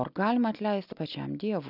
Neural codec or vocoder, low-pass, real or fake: none; 5.4 kHz; real